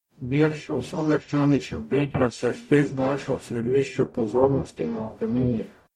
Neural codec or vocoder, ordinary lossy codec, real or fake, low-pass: codec, 44.1 kHz, 0.9 kbps, DAC; MP3, 64 kbps; fake; 19.8 kHz